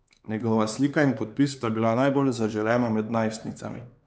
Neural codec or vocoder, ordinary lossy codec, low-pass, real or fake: codec, 16 kHz, 4 kbps, X-Codec, HuBERT features, trained on LibriSpeech; none; none; fake